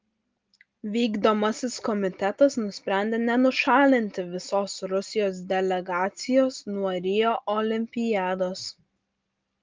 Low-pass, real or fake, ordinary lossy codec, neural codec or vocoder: 7.2 kHz; real; Opus, 32 kbps; none